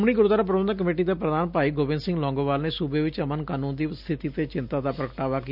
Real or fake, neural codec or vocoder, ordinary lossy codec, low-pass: real; none; none; 5.4 kHz